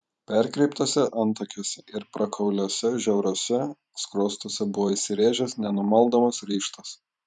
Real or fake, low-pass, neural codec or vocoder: fake; 10.8 kHz; vocoder, 44.1 kHz, 128 mel bands every 512 samples, BigVGAN v2